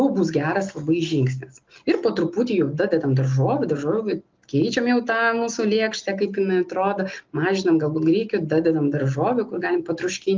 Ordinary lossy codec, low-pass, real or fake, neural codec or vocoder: Opus, 24 kbps; 7.2 kHz; real; none